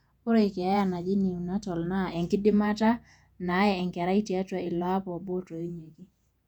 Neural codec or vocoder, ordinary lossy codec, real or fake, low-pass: vocoder, 48 kHz, 128 mel bands, Vocos; none; fake; 19.8 kHz